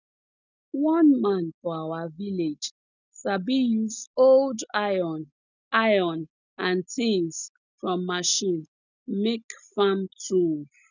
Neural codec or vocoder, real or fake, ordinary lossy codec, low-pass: none; real; none; 7.2 kHz